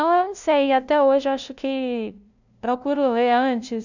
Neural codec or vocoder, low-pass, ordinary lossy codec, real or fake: codec, 16 kHz, 0.5 kbps, FunCodec, trained on LibriTTS, 25 frames a second; 7.2 kHz; none; fake